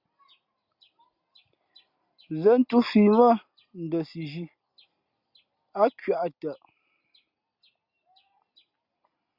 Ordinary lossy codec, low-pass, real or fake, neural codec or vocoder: Opus, 64 kbps; 5.4 kHz; real; none